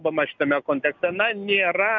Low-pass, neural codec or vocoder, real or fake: 7.2 kHz; none; real